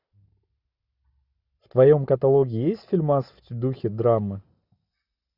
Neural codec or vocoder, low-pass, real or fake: none; 5.4 kHz; real